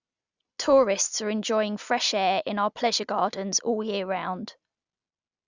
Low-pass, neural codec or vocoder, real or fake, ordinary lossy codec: 7.2 kHz; none; real; Opus, 64 kbps